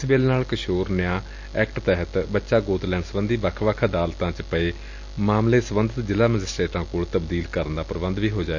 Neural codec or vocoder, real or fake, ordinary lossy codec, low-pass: none; real; none; 7.2 kHz